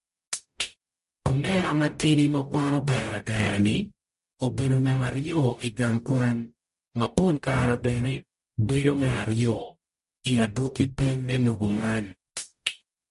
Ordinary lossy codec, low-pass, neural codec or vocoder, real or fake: MP3, 48 kbps; 14.4 kHz; codec, 44.1 kHz, 0.9 kbps, DAC; fake